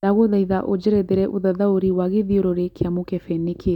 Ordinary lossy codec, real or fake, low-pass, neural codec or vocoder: none; real; 19.8 kHz; none